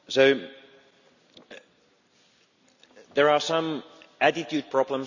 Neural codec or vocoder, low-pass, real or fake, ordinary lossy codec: none; 7.2 kHz; real; none